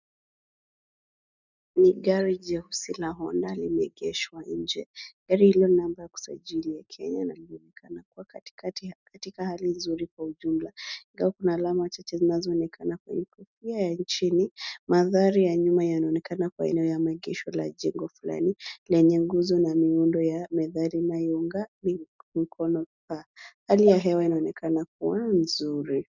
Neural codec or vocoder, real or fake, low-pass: none; real; 7.2 kHz